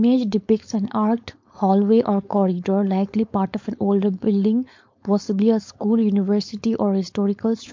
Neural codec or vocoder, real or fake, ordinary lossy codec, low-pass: codec, 16 kHz, 4.8 kbps, FACodec; fake; MP3, 48 kbps; 7.2 kHz